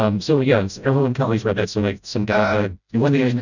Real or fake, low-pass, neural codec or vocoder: fake; 7.2 kHz; codec, 16 kHz, 0.5 kbps, FreqCodec, smaller model